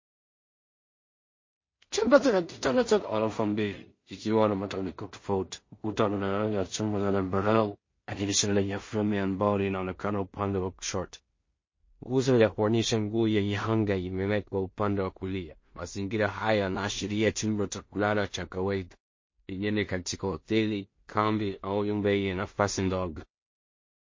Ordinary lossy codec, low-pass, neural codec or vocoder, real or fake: MP3, 32 kbps; 7.2 kHz; codec, 16 kHz in and 24 kHz out, 0.4 kbps, LongCat-Audio-Codec, two codebook decoder; fake